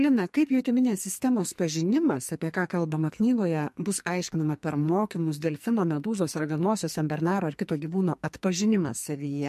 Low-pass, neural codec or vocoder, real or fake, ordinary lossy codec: 14.4 kHz; codec, 44.1 kHz, 2.6 kbps, SNAC; fake; MP3, 64 kbps